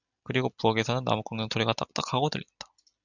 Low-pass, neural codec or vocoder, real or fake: 7.2 kHz; none; real